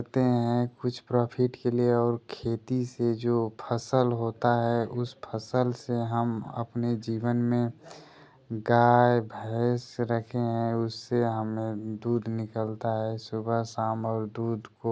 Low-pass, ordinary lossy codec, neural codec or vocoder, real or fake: none; none; none; real